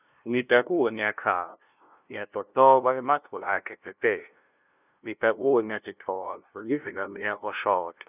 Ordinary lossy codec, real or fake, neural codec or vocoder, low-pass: none; fake; codec, 16 kHz, 0.5 kbps, FunCodec, trained on LibriTTS, 25 frames a second; 3.6 kHz